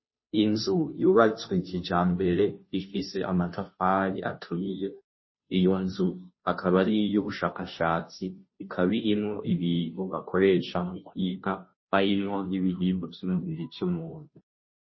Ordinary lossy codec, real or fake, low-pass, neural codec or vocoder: MP3, 24 kbps; fake; 7.2 kHz; codec, 16 kHz, 0.5 kbps, FunCodec, trained on Chinese and English, 25 frames a second